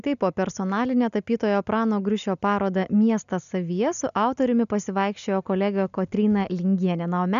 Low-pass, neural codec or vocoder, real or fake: 7.2 kHz; none; real